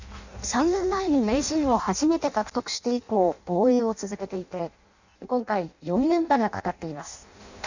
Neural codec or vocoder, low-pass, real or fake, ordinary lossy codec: codec, 16 kHz in and 24 kHz out, 0.6 kbps, FireRedTTS-2 codec; 7.2 kHz; fake; none